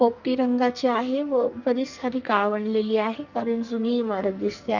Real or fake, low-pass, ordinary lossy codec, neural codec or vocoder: fake; 7.2 kHz; Opus, 64 kbps; codec, 44.1 kHz, 2.6 kbps, SNAC